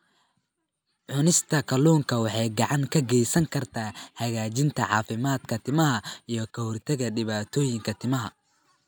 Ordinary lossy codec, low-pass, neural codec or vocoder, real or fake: none; none; none; real